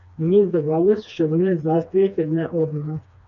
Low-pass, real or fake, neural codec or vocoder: 7.2 kHz; fake; codec, 16 kHz, 2 kbps, FreqCodec, smaller model